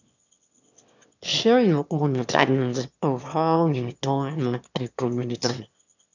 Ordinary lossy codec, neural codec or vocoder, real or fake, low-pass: none; autoencoder, 22.05 kHz, a latent of 192 numbers a frame, VITS, trained on one speaker; fake; 7.2 kHz